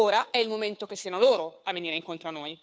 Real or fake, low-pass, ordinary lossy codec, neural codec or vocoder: fake; none; none; codec, 16 kHz, 2 kbps, FunCodec, trained on Chinese and English, 25 frames a second